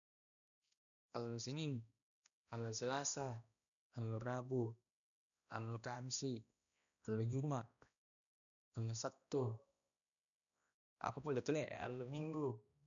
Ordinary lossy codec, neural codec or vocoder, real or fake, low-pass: none; codec, 16 kHz, 1 kbps, X-Codec, HuBERT features, trained on balanced general audio; fake; 7.2 kHz